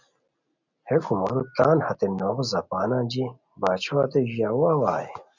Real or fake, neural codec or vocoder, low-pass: real; none; 7.2 kHz